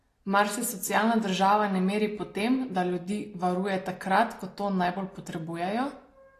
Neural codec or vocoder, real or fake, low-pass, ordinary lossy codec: none; real; 14.4 kHz; AAC, 48 kbps